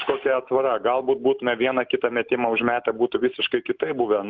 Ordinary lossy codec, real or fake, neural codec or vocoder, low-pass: Opus, 32 kbps; real; none; 7.2 kHz